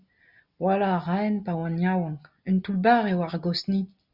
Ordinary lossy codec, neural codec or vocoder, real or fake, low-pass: Opus, 64 kbps; none; real; 5.4 kHz